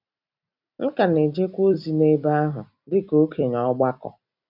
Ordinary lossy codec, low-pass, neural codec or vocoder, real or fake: none; 5.4 kHz; vocoder, 44.1 kHz, 128 mel bands every 256 samples, BigVGAN v2; fake